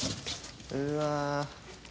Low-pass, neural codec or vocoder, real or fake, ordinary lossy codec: none; none; real; none